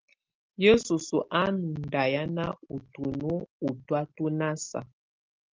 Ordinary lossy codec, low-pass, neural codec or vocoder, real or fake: Opus, 24 kbps; 7.2 kHz; none; real